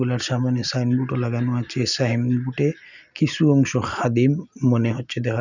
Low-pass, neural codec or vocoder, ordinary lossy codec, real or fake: 7.2 kHz; none; none; real